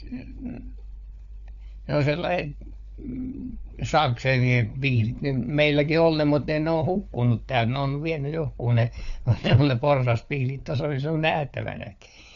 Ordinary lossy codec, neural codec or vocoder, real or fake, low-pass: none; codec, 16 kHz, 4 kbps, FunCodec, trained on LibriTTS, 50 frames a second; fake; 7.2 kHz